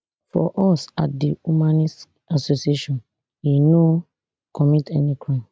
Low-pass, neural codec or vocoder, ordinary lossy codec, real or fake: none; none; none; real